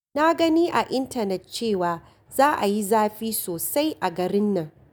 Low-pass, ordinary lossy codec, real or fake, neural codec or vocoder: none; none; real; none